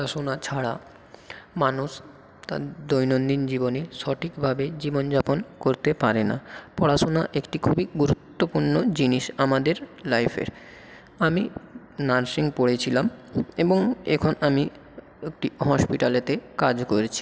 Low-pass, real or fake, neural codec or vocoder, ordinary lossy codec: none; real; none; none